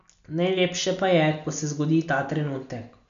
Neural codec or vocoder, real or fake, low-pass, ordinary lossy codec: none; real; 7.2 kHz; none